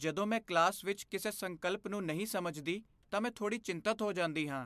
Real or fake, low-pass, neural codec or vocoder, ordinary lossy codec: real; 14.4 kHz; none; MP3, 96 kbps